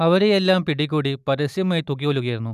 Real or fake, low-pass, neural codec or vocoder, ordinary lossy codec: real; 14.4 kHz; none; none